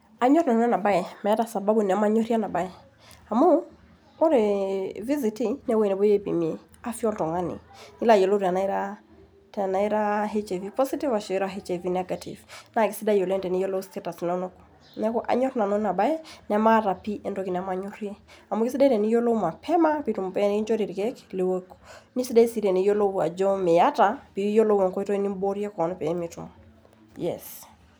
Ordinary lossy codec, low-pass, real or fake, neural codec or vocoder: none; none; real; none